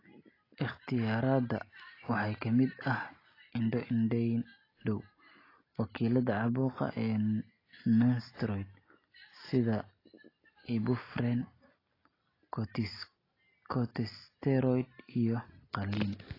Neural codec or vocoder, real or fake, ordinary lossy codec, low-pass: none; real; AAC, 24 kbps; 5.4 kHz